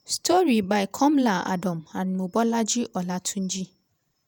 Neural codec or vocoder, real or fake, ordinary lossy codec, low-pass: vocoder, 48 kHz, 128 mel bands, Vocos; fake; none; none